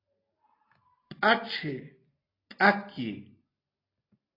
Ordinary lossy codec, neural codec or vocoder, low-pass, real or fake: AAC, 24 kbps; none; 5.4 kHz; real